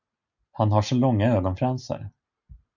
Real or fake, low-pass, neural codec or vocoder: real; 7.2 kHz; none